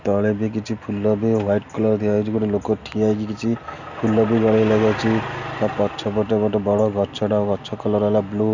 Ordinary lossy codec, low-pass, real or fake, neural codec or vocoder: Opus, 64 kbps; 7.2 kHz; real; none